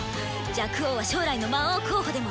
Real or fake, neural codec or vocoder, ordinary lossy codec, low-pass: real; none; none; none